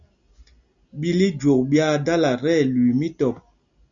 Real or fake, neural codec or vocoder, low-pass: real; none; 7.2 kHz